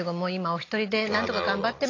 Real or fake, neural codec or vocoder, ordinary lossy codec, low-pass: real; none; AAC, 32 kbps; 7.2 kHz